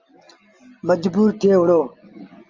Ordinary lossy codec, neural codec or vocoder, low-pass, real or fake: Opus, 32 kbps; none; 7.2 kHz; real